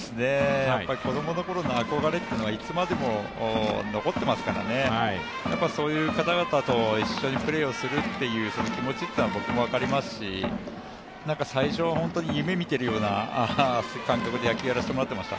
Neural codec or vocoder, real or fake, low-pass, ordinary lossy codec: none; real; none; none